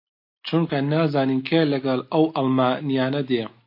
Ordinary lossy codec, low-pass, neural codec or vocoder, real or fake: MP3, 32 kbps; 5.4 kHz; none; real